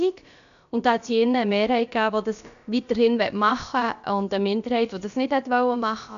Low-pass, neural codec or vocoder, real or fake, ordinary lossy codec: 7.2 kHz; codec, 16 kHz, about 1 kbps, DyCAST, with the encoder's durations; fake; none